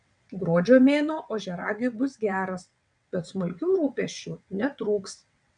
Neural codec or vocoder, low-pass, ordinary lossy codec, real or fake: vocoder, 22.05 kHz, 80 mel bands, WaveNeXt; 9.9 kHz; MP3, 96 kbps; fake